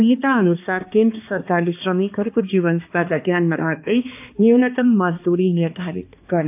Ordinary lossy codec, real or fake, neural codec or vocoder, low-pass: AAC, 32 kbps; fake; codec, 16 kHz, 2 kbps, X-Codec, HuBERT features, trained on balanced general audio; 3.6 kHz